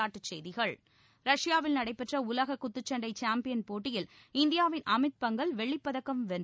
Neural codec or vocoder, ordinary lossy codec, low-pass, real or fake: none; none; none; real